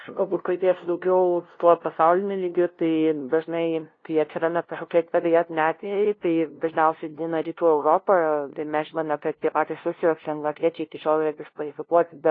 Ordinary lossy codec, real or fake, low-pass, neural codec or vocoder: AAC, 32 kbps; fake; 7.2 kHz; codec, 16 kHz, 0.5 kbps, FunCodec, trained on LibriTTS, 25 frames a second